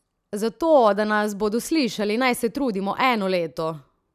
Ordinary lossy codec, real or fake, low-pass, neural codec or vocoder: none; real; 14.4 kHz; none